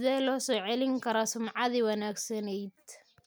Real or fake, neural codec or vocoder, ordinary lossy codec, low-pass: real; none; none; none